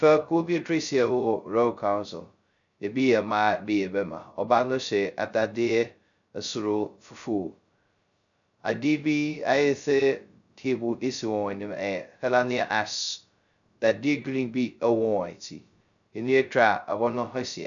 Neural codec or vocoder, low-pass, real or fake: codec, 16 kHz, 0.2 kbps, FocalCodec; 7.2 kHz; fake